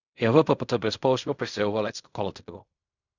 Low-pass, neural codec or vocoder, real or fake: 7.2 kHz; codec, 16 kHz in and 24 kHz out, 0.4 kbps, LongCat-Audio-Codec, fine tuned four codebook decoder; fake